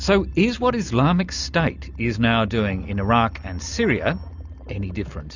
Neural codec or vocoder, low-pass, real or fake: none; 7.2 kHz; real